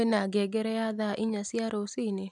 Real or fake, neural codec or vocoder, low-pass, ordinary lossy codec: real; none; none; none